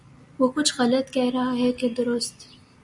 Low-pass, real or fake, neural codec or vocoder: 10.8 kHz; real; none